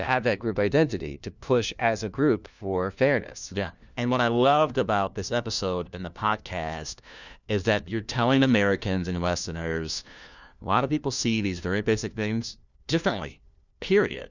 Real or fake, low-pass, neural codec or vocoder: fake; 7.2 kHz; codec, 16 kHz, 1 kbps, FunCodec, trained on LibriTTS, 50 frames a second